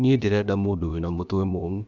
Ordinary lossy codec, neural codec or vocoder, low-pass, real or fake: none; codec, 16 kHz, about 1 kbps, DyCAST, with the encoder's durations; 7.2 kHz; fake